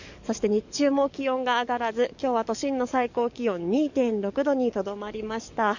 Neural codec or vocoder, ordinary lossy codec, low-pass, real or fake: codec, 44.1 kHz, 7.8 kbps, DAC; none; 7.2 kHz; fake